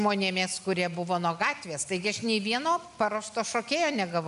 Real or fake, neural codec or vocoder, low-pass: real; none; 10.8 kHz